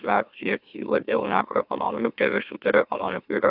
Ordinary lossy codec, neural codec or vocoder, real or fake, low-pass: AAC, 48 kbps; autoencoder, 44.1 kHz, a latent of 192 numbers a frame, MeloTTS; fake; 5.4 kHz